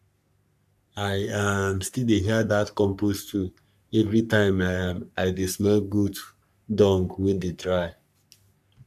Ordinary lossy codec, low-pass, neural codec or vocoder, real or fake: none; 14.4 kHz; codec, 44.1 kHz, 3.4 kbps, Pupu-Codec; fake